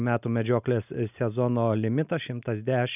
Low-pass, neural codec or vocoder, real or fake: 3.6 kHz; none; real